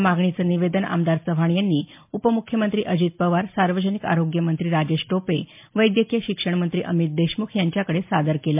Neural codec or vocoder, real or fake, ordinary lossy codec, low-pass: none; real; MP3, 32 kbps; 3.6 kHz